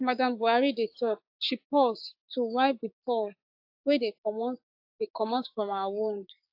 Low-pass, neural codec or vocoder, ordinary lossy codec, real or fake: 5.4 kHz; codec, 16 kHz, 6 kbps, DAC; AAC, 48 kbps; fake